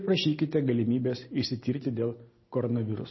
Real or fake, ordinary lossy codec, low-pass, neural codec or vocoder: real; MP3, 24 kbps; 7.2 kHz; none